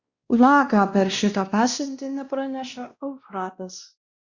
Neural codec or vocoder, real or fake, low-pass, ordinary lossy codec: codec, 16 kHz, 1 kbps, X-Codec, WavLM features, trained on Multilingual LibriSpeech; fake; 7.2 kHz; Opus, 64 kbps